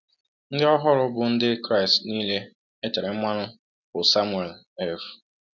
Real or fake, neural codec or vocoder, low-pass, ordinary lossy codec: real; none; 7.2 kHz; none